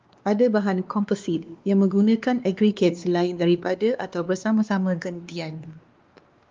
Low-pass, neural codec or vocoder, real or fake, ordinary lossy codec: 7.2 kHz; codec, 16 kHz, 2 kbps, X-Codec, WavLM features, trained on Multilingual LibriSpeech; fake; Opus, 32 kbps